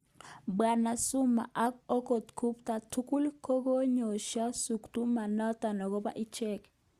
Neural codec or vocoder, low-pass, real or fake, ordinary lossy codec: none; 14.4 kHz; real; Opus, 64 kbps